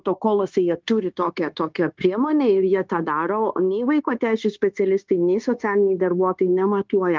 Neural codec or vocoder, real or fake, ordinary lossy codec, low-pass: codec, 16 kHz, 0.9 kbps, LongCat-Audio-Codec; fake; Opus, 32 kbps; 7.2 kHz